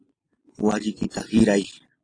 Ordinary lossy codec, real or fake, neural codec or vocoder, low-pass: AAC, 32 kbps; fake; vocoder, 44.1 kHz, 128 mel bands every 256 samples, BigVGAN v2; 9.9 kHz